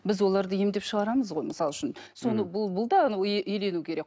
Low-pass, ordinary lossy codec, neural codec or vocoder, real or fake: none; none; none; real